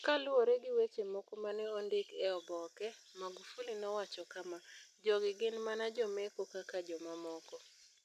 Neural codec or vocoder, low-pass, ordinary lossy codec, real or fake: none; 14.4 kHz; none; real